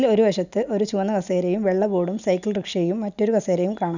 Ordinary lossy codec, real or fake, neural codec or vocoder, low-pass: none; real; none; 7.2 kHz